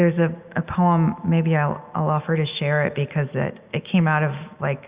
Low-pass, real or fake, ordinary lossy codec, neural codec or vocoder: 3.6 kHz; real; Opus, 64 kbps; none